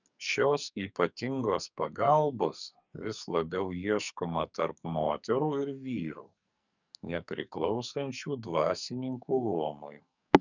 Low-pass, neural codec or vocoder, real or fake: 7.2 kHz; codec, 44.1 kHz, 2.6 kbps, SNAC; fake